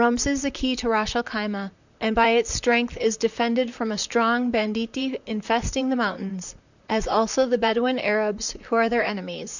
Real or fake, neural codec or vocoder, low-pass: fake; vocoder, 44.1 kHz, 128 mel bands, Pupu-Vocoder; 7.2 kHz